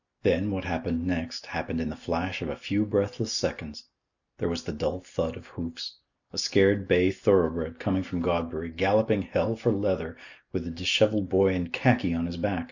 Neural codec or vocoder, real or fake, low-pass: none; real; 7.2 kHz